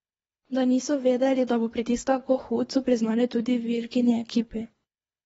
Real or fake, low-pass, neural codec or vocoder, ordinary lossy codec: fake; 10.8 kHz; codec, 24 kHz, 3 kbps, HILCodec; AAC, 24 kbps